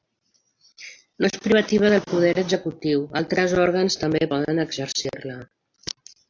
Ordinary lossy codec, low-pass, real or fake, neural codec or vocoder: AAC, 48 kbps; 7.2 kHz; real; none